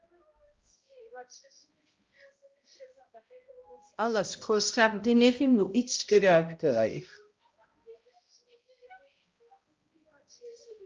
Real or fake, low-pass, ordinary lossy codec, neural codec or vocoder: fake; 7.2 kHz; Opus, 24 kbps; codec, 16 kHz, 0.5 kbps, X-Codec, HuBERT features, trained on balanced general audio